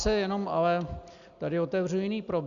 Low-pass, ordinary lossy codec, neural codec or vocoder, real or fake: 7.2 kHz; Opus, 64 kbps; none; real